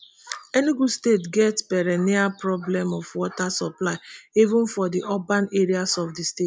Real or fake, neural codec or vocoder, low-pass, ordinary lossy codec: real; none; none; none